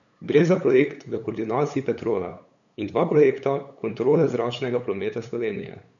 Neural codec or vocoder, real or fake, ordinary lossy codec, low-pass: codec, 16 kHz, 8 kbps, FunCodec, trained on LibriTTS, 25 frames a second; fake; none; 7.2 kHz